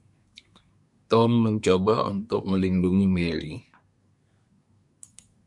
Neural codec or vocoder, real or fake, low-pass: codec, 24 kHz, 1 kbps, SNAC; fake; 10.8 kHz